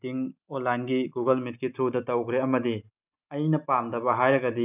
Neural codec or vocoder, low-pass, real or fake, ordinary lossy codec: none; 3.6 kHz; real; none